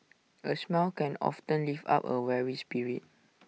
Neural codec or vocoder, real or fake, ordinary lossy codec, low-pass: none; real; none; none